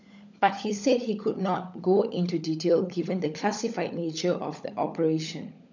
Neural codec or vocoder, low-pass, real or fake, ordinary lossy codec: codec, 16 kHz, 16 kbps, FunCodec, trained on LibriTTS, 50 frames a second; 7.2 kHz; fake; none